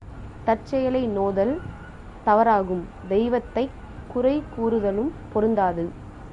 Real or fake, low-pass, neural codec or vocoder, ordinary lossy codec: real; 10.8 kHz; none; Opus, 64 kbps